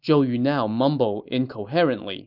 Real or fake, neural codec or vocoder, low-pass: real; none; 5.4 kHz